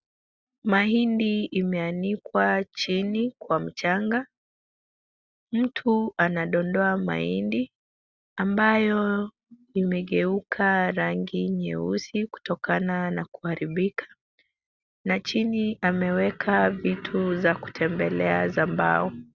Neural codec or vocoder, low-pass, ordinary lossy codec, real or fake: none; 7.2 kHz; Opus, 64 kbps; real